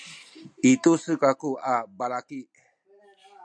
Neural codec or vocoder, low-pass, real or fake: none; 9.9 kHz; real